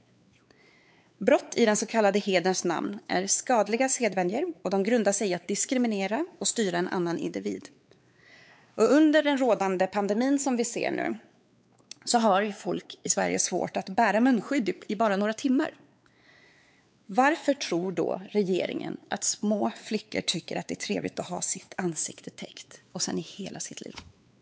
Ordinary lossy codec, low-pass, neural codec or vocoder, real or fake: none; none; codec, 16 kHz, 4 kbps, X-Codec, WavLM features, trained on Multilingual LibriSpeech; fake